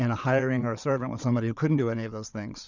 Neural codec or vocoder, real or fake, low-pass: vocoder, 22.05 kHz, 80 mel bands, Vocos; fake; 7.2 kHz